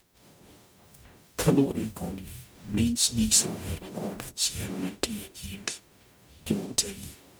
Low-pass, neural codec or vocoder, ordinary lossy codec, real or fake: none; codec, 44.1 kHz, 0.9 kbps, DAC; none; fake